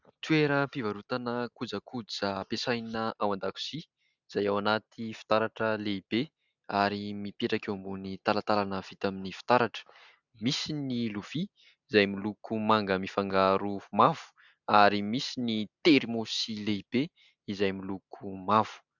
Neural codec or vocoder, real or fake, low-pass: none; real; 7.2 kHz